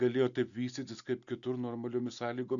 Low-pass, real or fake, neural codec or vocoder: 7.2 kHz; real; none